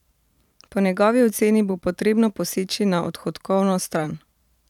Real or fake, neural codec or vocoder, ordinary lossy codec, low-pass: fake; vocoder, 44.1 kHz, 128 mel bands every 512 samples, BigVGAN v2; none; 19.8 kHz